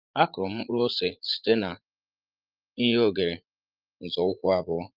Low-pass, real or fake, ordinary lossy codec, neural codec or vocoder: 5.4 kHz; fake; Opus, 32 kbps; vocoder, 44.1 kHz, 80 mel bands, Vocos